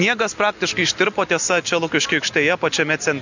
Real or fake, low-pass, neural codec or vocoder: real; 7.2 kHz; none